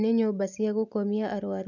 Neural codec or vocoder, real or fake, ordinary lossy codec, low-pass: none; real; none; 7.2 kHz